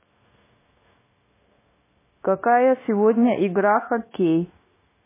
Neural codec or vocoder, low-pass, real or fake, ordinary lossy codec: codec, 16 kHz, 0.9 kbps, LongCat-Audio-Codec; 3.6 kHz; fake; MP3, 16 kbps